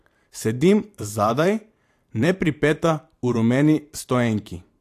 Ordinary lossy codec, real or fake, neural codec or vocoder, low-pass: AAC, 64 kbps; fake; vocoder, 44.1 kHz, 128 mel bands every 512 samples, BigVGAN v2; 14.4 kHz